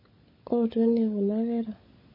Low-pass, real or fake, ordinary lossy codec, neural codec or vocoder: 5.4 kHz; fake; MP3, 24 kbps; codec, 16 kHz, 16 kbps, FunCodec, trained on LibriTTS, 50 frames a second